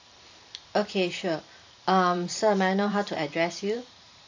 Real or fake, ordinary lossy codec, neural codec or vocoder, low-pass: fake; AAC, 48 kbps; vocoder, 44.1 kHz, 128 mel bands every 512 samples, BigVGAN v2; 7.2 kHz